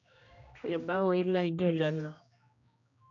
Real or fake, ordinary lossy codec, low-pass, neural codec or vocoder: fake; none; 7.2 kHz; codec, 16 kHz, 1 kbps, X-Codec, HuBERT features, trained on general audio